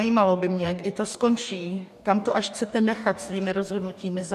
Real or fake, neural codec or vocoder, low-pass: fake; codec, 44.1 kHz, 2.6 kbps, DAC; 14.4 kHz